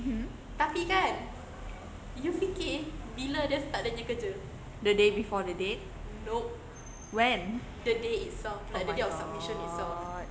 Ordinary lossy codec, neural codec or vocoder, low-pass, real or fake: none; none; none; real